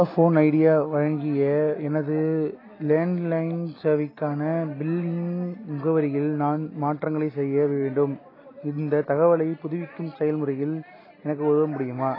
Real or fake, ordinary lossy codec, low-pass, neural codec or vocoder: real; AAC, 24 kbps; 5.4 kHz; none